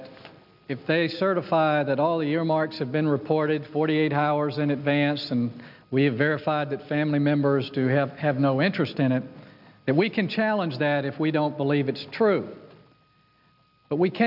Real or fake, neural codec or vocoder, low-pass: real; none; 5.4 kHz